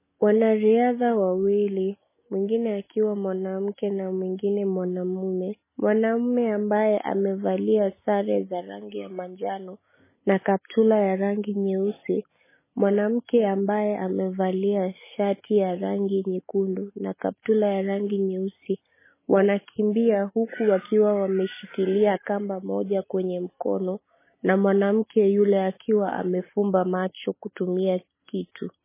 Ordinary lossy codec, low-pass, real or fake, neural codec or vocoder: MP3, 16 kbps; 3.6 kHz; real; none